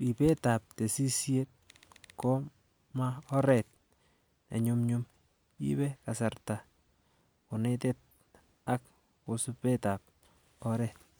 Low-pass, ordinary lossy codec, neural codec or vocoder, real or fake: none; none; none; real